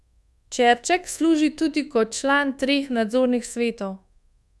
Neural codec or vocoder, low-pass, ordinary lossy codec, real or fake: codec, 24 kHz, 1.2 kbps, DualCodec; none; none; fake